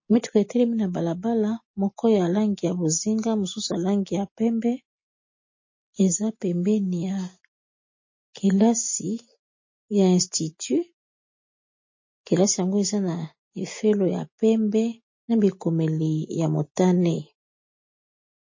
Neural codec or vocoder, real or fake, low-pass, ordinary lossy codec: none; real; 7.2 kHz; MP3, 32 kbps